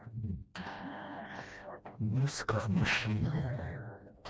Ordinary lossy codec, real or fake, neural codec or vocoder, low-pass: none; fake; codec, 16 kHz, 1 kbps, FreqCodec, smaller model; none